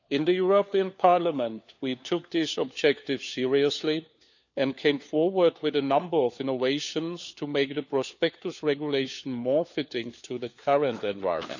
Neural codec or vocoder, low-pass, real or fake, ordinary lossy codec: codec, 16 kHz, 4 kbps, FunCodec, trained on LibriTTS, 50 frames a second; 7.2 kHz; fake; none